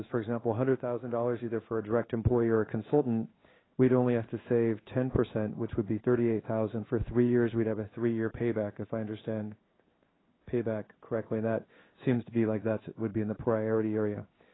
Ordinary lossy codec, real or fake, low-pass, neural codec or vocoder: AAC, 16 kbps; fake; 7.2 kHz; codec, 16 kHz in and 24 kHz out, 1 kbps, XY-Tokenizer